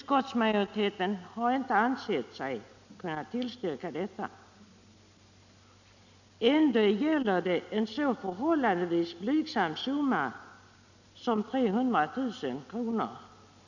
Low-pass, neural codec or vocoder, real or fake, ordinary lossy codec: 7.2 kHz; none; real; none